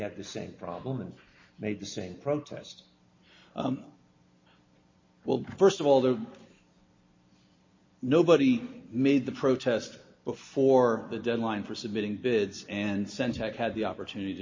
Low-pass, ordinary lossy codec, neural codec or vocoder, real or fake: 7.2 kHz; MP3, 32 kbps; none; real